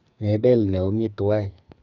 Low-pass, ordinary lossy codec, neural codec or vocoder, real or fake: 7.2 kHz; none; codec, 44.1 kHz, 2.6 kbps, SNAC; fake